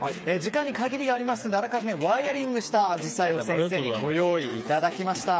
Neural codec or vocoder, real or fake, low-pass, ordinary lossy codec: codec, 16 kHz, 4 kbps, FreqCodec, smaller model; fake; none; none